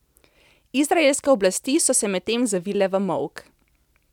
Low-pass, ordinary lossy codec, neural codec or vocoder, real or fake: 19.8 kHz; none; none; real